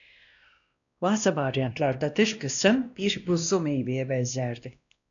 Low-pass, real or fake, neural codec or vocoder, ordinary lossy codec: 7.2 kHz; fake; codec, 16 kHz, 1 kbps, X-Codec, WavLM features, trained on Multilingual LibriSpeech; MP3, 96 kbps